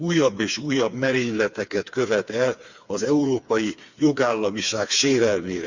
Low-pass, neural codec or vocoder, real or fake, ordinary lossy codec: 7.2 kHz; codec, 16 kHz, 4 kbps, FreqCodec, smaller model; fake; Opus, 64 kbps